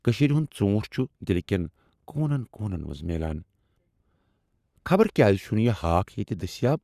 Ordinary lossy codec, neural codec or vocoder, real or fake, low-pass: Opus, 64 kbps; codec, 44.1 kHz, 7.8 kbps, Pupu-Codec; fake; 14.4 kHz